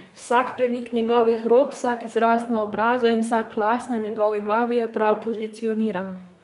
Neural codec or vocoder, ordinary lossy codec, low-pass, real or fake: codec, 24 kHz, 1 kbps, SNAC; none; 10.8 kHz; fake